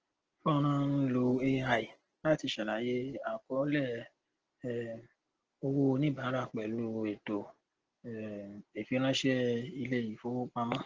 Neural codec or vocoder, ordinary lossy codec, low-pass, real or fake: none; Opus, 16 kbps; 7.2 kHz; real